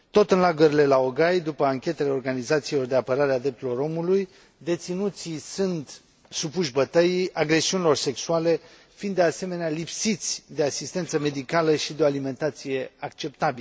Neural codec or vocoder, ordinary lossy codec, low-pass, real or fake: none; none; none; real